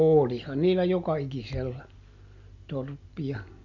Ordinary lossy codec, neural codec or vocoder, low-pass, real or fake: none; none; 7.2 kHz; real